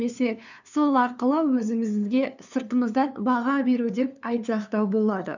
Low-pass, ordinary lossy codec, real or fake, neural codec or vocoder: 7.2 kHz; none; fake; codec, 16 kHz, 2 kbps, FunCodec, trained on LibriTTS, 25 frames a second